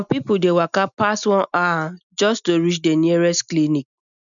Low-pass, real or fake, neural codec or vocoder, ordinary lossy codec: 7.2 kHz; real; none; none